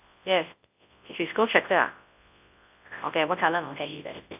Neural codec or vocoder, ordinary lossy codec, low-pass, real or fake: codec, 24 kHz, 0.9 kbps, WavTokenizer, large speech release; AAC, 32 kbps; 3.6 kHz; fake